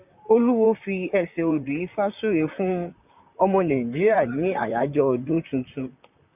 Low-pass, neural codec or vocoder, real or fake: 3.6 kHz; vocoder, 44.1 kHz, 128 mel bands, Pupu-Vocoder; fake